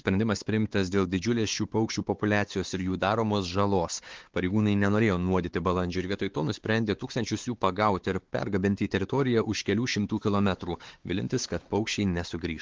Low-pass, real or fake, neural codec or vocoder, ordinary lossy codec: 7.2 kHz; fake; codec, 16 kHz, 4 kbps, X-Codec, WavLM features, trained on Multilingual LibriSpeech; Opus, 16 kbps